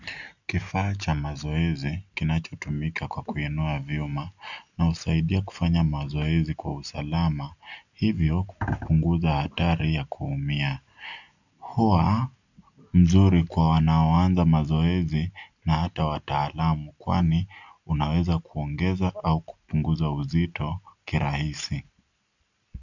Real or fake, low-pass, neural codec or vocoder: real; 7.2 kHz; none